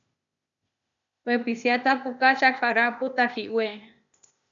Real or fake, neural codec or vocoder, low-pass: fake; codec, 16 kHz, 0.8 kbps, ZipCodec; 7.2 kHz